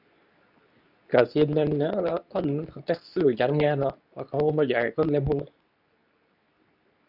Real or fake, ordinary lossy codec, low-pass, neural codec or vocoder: fake; none; 5.4 kHz; codec, 24 kHz, 0.9 kbps, WavTokenizer, medium speech release version 2